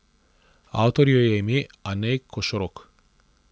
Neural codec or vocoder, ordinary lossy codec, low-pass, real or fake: none; none; none; real